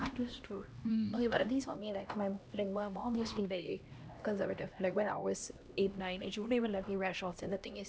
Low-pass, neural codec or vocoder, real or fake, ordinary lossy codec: none; codec, 16 kHz, 1 kbps, X-Codec, HuBERT features, trained on LibriSpeech; fake; none